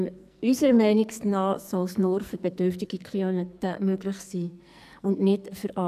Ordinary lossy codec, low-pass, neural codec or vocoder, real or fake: none; 14.4 kHz; codec, 44.1 kHz, 2.6 kbps, SNAC; fake